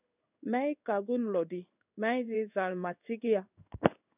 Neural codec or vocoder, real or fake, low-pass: codec, 16 kHz in and 24 kHz out, 1 kbps, XY-Tokenizer; fake; 3.6 kHz